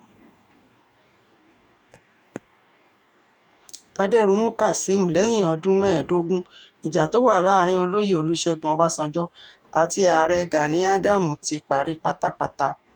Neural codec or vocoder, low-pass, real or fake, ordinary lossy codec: codec, 44.1 kHz, 2.6 kbps, DAC; 19.8 kHz; fake; none